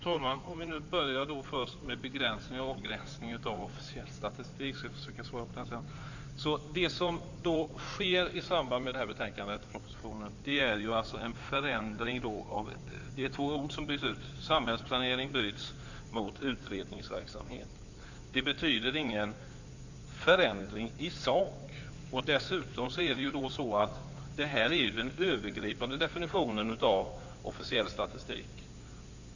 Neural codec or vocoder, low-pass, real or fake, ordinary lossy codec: codec, 16 kHz in and 24 kHz out, 2.2 kbps, FireRedTTS-2 codec; 7.2 kHz; fake; none